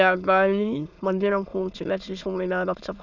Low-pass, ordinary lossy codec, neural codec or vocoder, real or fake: 7.2 kHz; none; autoencoder, 22.05 kHz, a latent of 192 numbers a frame, VITS, trained on many speakers; fake